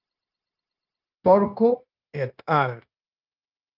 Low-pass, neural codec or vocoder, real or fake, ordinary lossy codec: 5.4 kHz; codec, 16 kHz, 0.9 kbps, LongCat-Audio-Codec; fake; Opus, 24 kbps